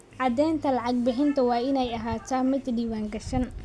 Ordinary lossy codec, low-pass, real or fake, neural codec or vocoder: none; none; real; none